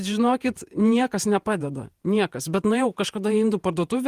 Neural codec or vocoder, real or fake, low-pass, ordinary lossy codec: vocoder, 48 kHz, 128 mel bands, Vocos; fake; 14.4 kHz; Opus, 24 kbps